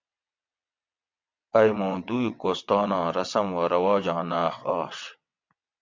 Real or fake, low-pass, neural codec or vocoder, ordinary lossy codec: fake; 7.2 kHz; vocoder, 22.05 kHz, 80 mel bands, WaveNeXt; MP3, 64 kbps